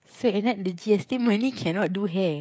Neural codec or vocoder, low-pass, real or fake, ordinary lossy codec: codec, 16 kHz, 16 kbps, FreqCodec, smaller model; none; fake; none